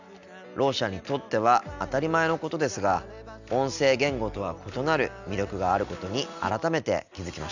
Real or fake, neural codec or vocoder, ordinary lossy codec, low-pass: real; none; none; 7.2 kHz